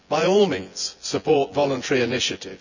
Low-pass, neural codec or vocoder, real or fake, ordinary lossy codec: 7.2 kHz; vocoder, 24 kHz, 100 mel bands, Vocos; fake; none